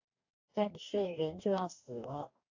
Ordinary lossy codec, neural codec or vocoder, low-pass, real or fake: AAC, 48 kbps; codec, 44.1 kHz, 2.6 kbps, DAC; 7.2 kHz; fake